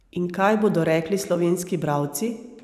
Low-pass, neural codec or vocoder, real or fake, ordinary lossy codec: 14.4 kHz; vocoder, 44.1 kHz, 128 mel bands every 512 samples, BigVGAN v2; fake; none